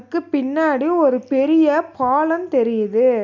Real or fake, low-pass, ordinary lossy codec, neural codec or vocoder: real; 7.2 kHz; none; none